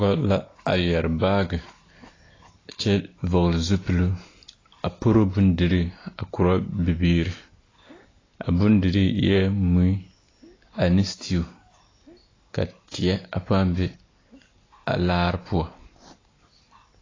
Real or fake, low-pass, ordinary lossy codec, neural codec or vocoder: real; 7.2 kHz; AAC, 32 kbps; none